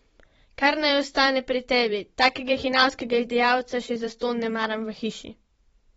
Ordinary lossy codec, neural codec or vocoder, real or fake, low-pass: AAC, 24 kbps; vocoder, 44.1 kHz, 128 mel bands every 512 samples, BigVGAN v2; fake; 19.8 kHz